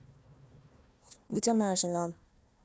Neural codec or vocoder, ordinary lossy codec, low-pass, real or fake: codec, 16 kHz, 1 kbps, FunCodec, trained on Chinese and English, 50 frames a second; none; none; fake